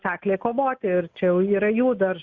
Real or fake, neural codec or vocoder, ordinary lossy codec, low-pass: real; none; Opus, 64 kbps; 7.2 kHz